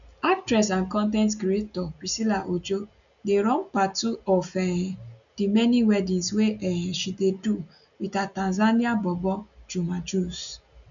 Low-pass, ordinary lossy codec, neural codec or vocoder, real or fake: 7.2 kHz; none; none; real